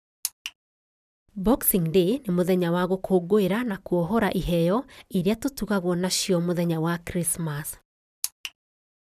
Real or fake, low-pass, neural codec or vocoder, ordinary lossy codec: real; 14.4 kHz; none; none